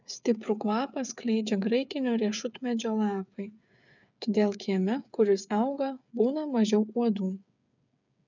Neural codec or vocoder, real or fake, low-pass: codec, 16 kHz, 8 kbps, FreqCodec, smaller model; fake; 7.2 kHz